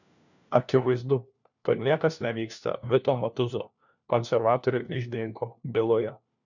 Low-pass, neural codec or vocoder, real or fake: 7.2 kHz; codec, 16 kHz, 1 kbps, FunCodec, trained on LibriTTS, 50 frames a second; fake